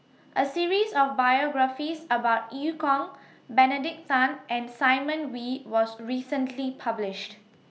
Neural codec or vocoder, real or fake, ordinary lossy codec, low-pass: none; real; none; none